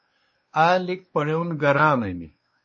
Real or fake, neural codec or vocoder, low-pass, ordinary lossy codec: fake; codec, 16 kHz, 2 kbps, X-Codec, WavLM features, trained on Multilingual LibriSpeech; 7.2 kHz; MP3, 32 kbps